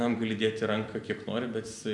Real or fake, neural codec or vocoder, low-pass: fake; vocoder, 44.1 kHz, 128 mel bands every 256 samples, BigVGAN v2; 10.8 kHz